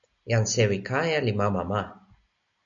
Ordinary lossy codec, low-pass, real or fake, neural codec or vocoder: MP3, 64 kbps; 7.2 kHz; real; none